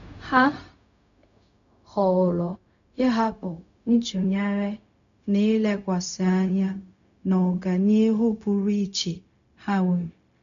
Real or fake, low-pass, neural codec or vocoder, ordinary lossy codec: fake; 7.2 kHz; codec, 16 kHz, 0.4 kbps, LongCat-Audio-Codec; none